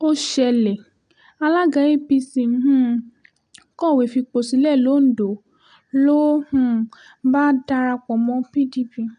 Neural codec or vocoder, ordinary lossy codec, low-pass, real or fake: none; none; 9.9 kHz; real